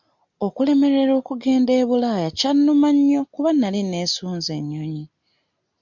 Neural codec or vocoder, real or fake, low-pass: none; real; 7.2 kHz